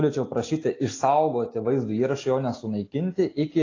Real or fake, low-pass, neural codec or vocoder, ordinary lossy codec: real; 7.2 kHz; none; AAC, 32 kbps